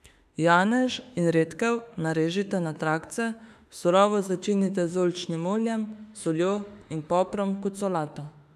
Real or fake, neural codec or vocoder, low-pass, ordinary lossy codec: fake; autoencoder, 48 kHz, 32 numbers a frame, DAC-VAE, trained on Japanese speech; 14.4 kHz; none